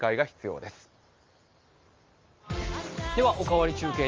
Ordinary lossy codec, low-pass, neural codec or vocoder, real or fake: Opus, 24 kbps; 7.2 kHz; none; real